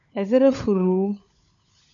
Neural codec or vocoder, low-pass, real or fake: codec, 16 kHz, 16 kbps, FunCodec, trained on LibriTTS, 50 frames a second; 7.2 kHz; fake